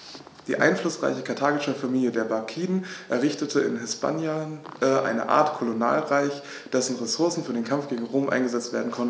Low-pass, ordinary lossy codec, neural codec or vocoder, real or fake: none; none; none; real